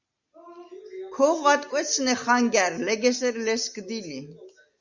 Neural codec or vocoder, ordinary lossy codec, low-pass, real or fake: none; Opus, 64 kbps; 7.2 kHz; real